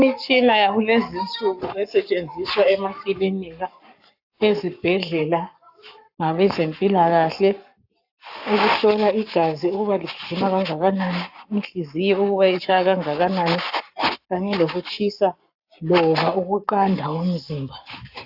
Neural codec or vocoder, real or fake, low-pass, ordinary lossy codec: codec, 44.1 kHz, 7.8 kbps, Pupu-Codec; fake; 5.4 kHz; AAC, 48 kbps